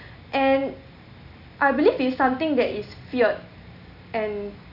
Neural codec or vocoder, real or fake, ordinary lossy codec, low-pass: none; real; none; 5.4 kHz